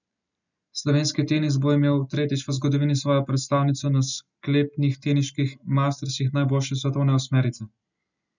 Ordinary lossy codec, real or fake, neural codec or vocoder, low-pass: none; real; none; 7.2 kHz